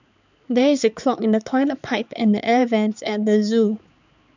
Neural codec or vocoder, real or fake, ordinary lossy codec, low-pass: codec, 16 kHz, 4 kbps, X-Codec, HuBERT features, trained on balanced general audio; fake; none; 7.2 kHz